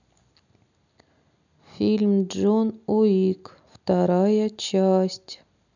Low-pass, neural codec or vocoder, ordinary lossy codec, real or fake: 7.2 kHz; none; none; real